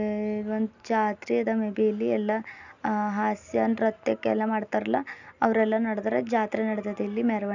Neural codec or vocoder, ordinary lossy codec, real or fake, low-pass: none; none; real; 7.2 kHz